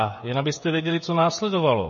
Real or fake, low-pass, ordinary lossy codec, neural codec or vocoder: fake; 7.2 kHz; MP3, 32 kbps; codec, 16 kHz, 16 kbps, FreqCodec, smaller model